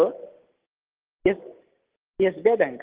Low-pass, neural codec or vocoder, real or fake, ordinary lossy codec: 3.6 kHz; none; real; Opus, 16 kbps